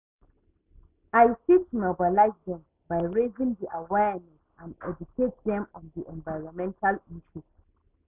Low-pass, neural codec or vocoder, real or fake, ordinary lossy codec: 3.6 kHz; none; real; none